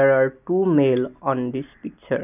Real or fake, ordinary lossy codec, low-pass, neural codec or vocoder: real; none; 3.6 kHz; none